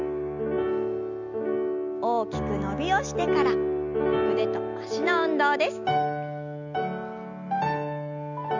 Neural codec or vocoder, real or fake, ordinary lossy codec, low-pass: none; real; none; 7.2 kHz